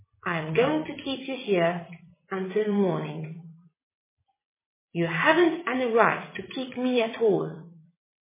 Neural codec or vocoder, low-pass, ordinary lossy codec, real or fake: vocoder, 22.05 kHz, 80 mel bands, WaveNeXt; 3.6 kHz; MP3, 16 kbps; fake